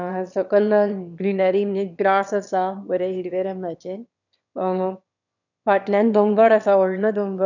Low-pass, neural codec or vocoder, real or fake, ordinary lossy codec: 7.2 kHz; autoencoder, 22.05 kHz, a latent of 192 numbers a frame, VITS, trained on one speaker; fake; none